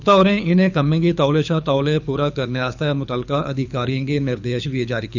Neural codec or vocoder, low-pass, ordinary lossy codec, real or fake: codec, 24 kHz, 6 kbps, HILCodec; 7.2 kHz; none; fake